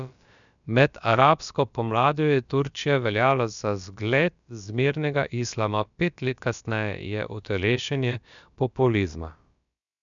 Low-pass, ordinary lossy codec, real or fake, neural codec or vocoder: 7.2 kHz; none; fake; codec, 16 kHz, about 1 kbps, DyCAST, with the encoder's durations